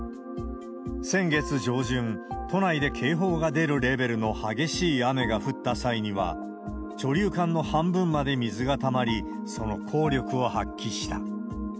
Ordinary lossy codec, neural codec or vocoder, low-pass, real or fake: none; none; none; real